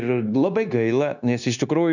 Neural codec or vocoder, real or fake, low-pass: codec, 16 kHz, 0.9 kbps, LongCat-Audio-Codec; fake; 7.2 kHz